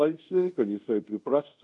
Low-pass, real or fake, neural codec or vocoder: 10.8 kHz; fake; codec, 24 kHz, 0.5 kbps, DualCodec